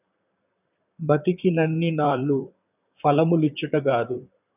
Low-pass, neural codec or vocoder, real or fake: 3.6 kHz; vocoder, 44.1 kHz, 128 mel bands, Pupu-Vocoder; fake